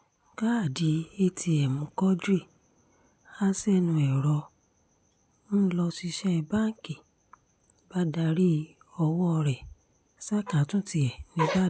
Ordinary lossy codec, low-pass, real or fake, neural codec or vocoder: none; none; real; none